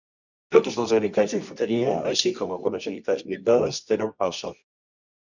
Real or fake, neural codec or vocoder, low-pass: fake; codec, 24 kHz, 0.9 kbps, WavTokenizer, medium music audio release; 7.2 kHz